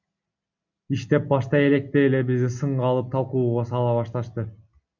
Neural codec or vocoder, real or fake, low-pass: none; real; 7.2 kHz